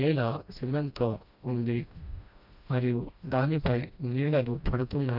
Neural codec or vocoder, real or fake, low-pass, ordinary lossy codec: codec, 16 kHz, 1 kbps, FreqCodec, smaller model; fake; 5.4 kHz; none